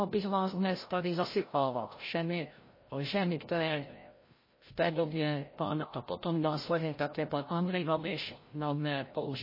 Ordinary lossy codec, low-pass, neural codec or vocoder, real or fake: MP3, 24 kbps; 5.4 kHz; codec, 16 kHz, 0.5 kbps, FreqCodec, larger model; fake